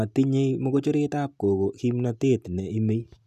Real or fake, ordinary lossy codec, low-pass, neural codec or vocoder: real; none; 14.4 kHz; none